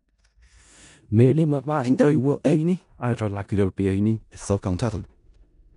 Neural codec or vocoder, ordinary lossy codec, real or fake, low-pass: codec, 16 kHz in and 24 kHz out, 0.4 kbps, LongCat-Audio-Codec, four codebook decoder; none; fake; 10.8 kHz